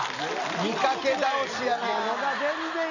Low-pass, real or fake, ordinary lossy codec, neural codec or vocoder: 7.2 kHz; real; none; none